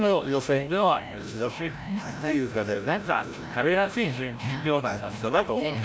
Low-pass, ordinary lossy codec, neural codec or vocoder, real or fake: none; none; codec, 16 kHz, 0.5 kbps, FreqCodec, larger model; fake